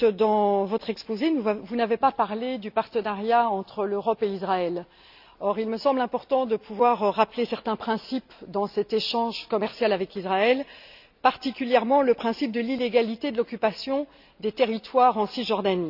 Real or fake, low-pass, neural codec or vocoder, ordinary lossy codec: real; 5.4 kHz; none; none